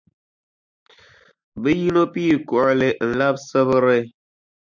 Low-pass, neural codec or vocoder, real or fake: 7.2 kHz; none; real